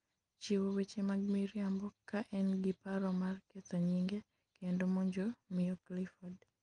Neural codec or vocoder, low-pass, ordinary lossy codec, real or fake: none; 9.9 kHz; Opus, 32 kbps; real